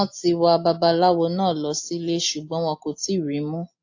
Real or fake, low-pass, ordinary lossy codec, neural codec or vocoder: real; 7.2 kHz; AAC, 48 kbps; none